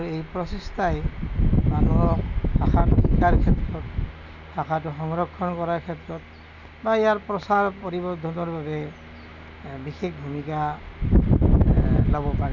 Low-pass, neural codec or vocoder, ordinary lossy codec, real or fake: 7.2 kHz; none; none; real